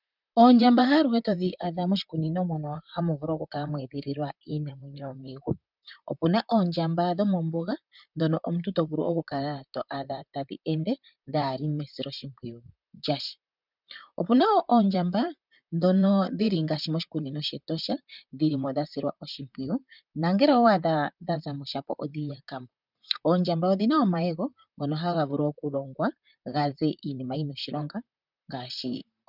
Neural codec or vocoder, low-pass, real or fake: vocoder, 44.1 kHz, 128 mel bands, Pupu-Vocoder; 5.4 kHz; fake